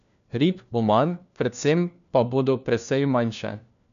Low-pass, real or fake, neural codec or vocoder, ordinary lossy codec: 7.2 kHz; fake; codec, 16 kHz, 1 kbps, FunCodec, trained on LibriTTS, 50 frames a second; none